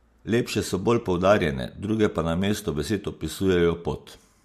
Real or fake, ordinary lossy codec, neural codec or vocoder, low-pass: real; MP3, 64 kbps; none; 14.4 kHz